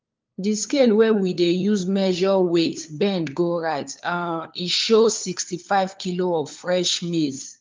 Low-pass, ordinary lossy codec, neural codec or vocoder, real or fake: 7.2 kHz; Opus, 24 kbps; codec, 16 kHz, 4 kbps, FunCodec, trained on LibriTTS, 50 frames a second; fake